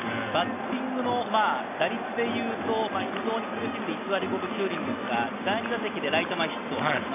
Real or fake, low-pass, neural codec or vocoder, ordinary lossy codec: real; 3.6 kHz; none; AAC, 32 kbps